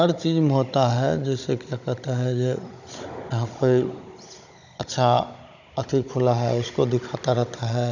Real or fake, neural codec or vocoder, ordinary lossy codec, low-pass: fake; codec, 16 kHz, 16 kbps, FunCodec, trained on Chinese and English, 50 frames a second; none; 7.2 kHz